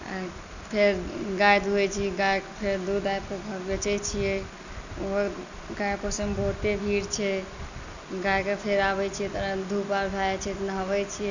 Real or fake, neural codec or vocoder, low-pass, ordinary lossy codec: real; none; 7.2 kHz; none